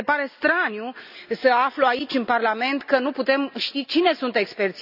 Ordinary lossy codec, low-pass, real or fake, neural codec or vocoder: none; 5.4 kHz; real; none